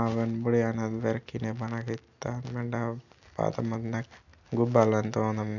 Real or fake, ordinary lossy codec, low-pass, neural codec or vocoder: real; none; 7.2 kHz; none